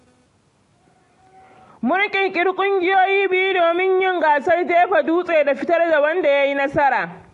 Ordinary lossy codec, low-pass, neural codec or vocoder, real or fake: AAC, 48 kbps; 10.8 kHz; none; real